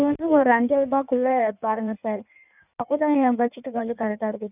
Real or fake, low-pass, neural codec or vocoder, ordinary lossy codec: fake; 3.6 kHz; codec, 16 kHz in and 24 kHz out, 1.1 kbps, FireRedTTS-2 codec; none